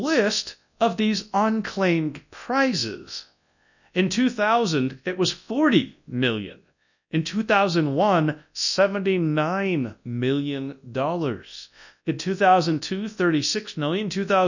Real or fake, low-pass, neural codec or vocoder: fake; 7.2 kHz; codec, 24 kHz, 0.9 kbps, WavTokenizer, large speech release